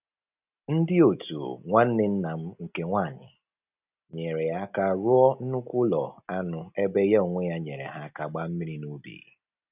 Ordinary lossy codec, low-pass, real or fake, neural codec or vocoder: none; 3.6 kHz; real; none